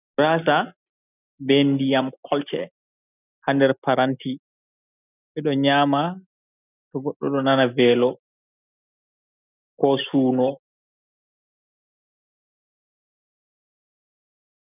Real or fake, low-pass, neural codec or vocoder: real; 3.6 kHz; none